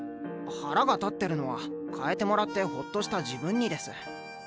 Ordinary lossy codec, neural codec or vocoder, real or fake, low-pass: none; none; real; none